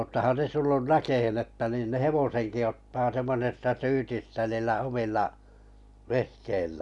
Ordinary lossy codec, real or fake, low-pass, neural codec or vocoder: none; real; 10.8 kHz; none